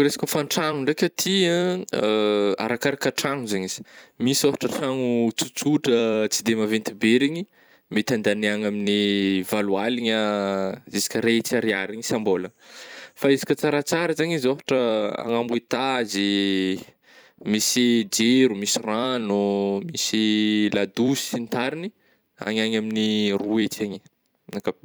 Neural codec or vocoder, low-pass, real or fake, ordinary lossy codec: vocoder, 44.1 kHz, 128 mel bands every 256 samples, BigVGAN v2; none; fake; none